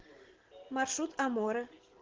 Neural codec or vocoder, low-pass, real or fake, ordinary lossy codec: none; 7.2 kHz; real; Opus, 16 kbps